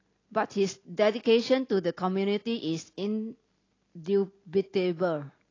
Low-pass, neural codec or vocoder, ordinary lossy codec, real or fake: 7.2 kHz; none; AAC, 32 kbps; real